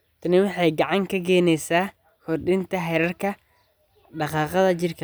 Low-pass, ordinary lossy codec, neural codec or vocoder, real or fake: none; none; none; real